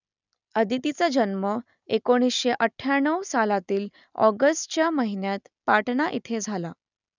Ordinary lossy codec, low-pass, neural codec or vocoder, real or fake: none; 7.2 kHz; none; real